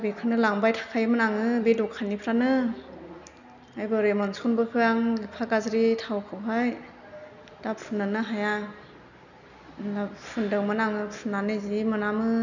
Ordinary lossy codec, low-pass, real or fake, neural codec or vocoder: none; 7.2 kHz; real; none